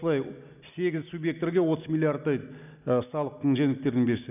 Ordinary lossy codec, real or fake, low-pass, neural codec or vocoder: none; real; 3.6 kHz; none